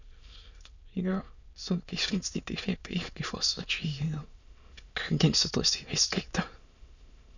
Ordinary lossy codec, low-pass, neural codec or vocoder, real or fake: none; 7.2 kHz; autoencoder, 22.05 kHz, a latent of 192 numbers a frame, VITS, trained on many speakers; fake